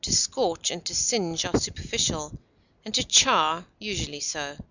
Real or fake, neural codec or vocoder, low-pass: real; none; 7.2 kHz